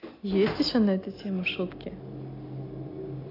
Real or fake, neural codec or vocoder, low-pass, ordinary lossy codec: real; none; 5.4 kHz; AAC, 24 kbps